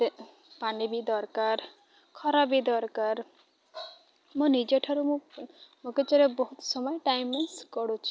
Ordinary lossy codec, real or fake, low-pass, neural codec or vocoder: none; real; none; none